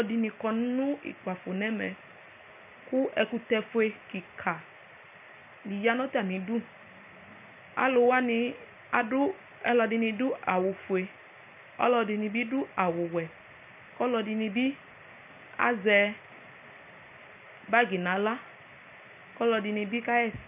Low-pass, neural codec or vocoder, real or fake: 3.6 kHz; none; real